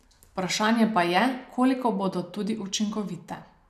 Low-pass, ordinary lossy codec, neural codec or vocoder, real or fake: 14.4 kHz; none; none; real